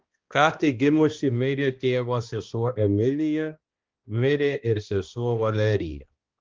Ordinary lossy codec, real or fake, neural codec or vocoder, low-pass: Opus, 32 kbps; fake; codec, 16 kHz, 1 kbps, X-Codec, HuBERT features, trained on balanced general audio; 7.2 kHz